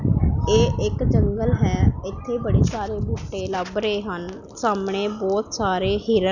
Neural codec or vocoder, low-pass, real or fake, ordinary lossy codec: none; 7.2 kHz; real; none